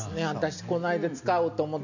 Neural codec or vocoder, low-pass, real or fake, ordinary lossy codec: none; 7.2 kHz; real; none